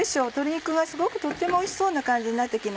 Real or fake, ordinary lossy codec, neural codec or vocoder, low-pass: real; none; none; none